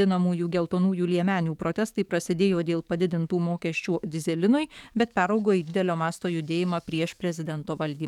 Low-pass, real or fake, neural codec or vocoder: 19.8 kHz; fake; codec, 44.1 kHz, 7.8 kbps, DAC